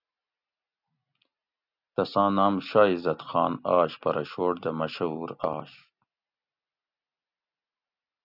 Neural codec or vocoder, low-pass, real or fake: none; 5.4 kHz; real